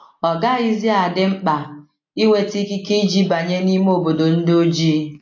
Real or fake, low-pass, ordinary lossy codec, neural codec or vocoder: real; 7.2 kHz; AAC, 48 kbps; none